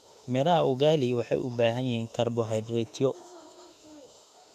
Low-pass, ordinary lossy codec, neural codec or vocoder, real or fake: 14.4 kHz; none; autoencoder, 48 kHz, 32 numbers a frame, DAC-VAE, trained on Japanese speech; fake